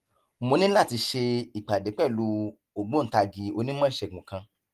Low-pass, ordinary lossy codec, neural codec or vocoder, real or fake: 14.4 kHz; Opus, 24 kbps; none; real